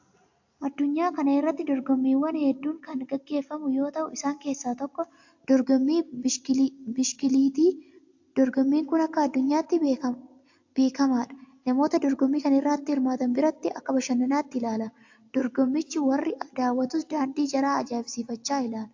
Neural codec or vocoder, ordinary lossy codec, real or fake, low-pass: none; AAC, 48 kbps; real; 7.2 kHz